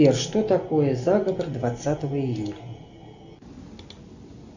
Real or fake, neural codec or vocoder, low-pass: real; none; 7.2 kHz